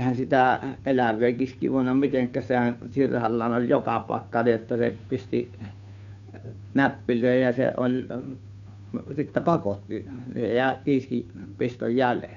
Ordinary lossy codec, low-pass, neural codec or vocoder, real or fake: none; 7.2 kHz; codec, 16 kHz, 2 kbps, FunCodec, trained on Chinese and English, 25 frames a second; fake